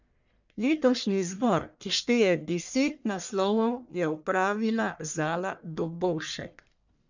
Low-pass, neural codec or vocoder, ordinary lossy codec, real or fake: 7.2 kHz; codec, 44.1 kHz, 1.7 kbps, Pupu-Codec; none; fake